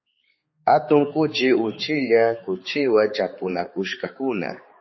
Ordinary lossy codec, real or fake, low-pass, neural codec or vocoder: MP3, 24 kbps; fake; 7.2 kHz; codec, 16 kHz, 4 kbps, X-Codec, HuBERT features, trained on balanced general audio